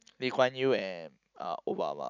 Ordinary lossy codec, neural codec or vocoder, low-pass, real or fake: none; none; 7.2 kHz; real